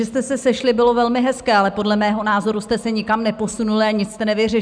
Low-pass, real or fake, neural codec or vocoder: 9.9 kHz; real; none